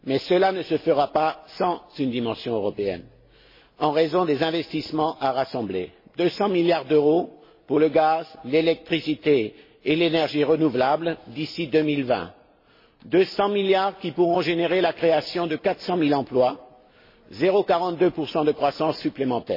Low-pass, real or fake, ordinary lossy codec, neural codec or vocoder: 5.4 kHz; real; MP3, 24 kbps; none